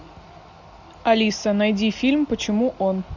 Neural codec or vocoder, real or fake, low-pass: none; real; 7.2 kHz